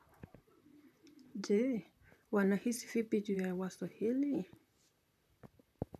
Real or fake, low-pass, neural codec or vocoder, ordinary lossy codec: fake; 14.4 kHz; vocoder, 44.1 kHz, 128 mel bands, Pupu-Vocoder; none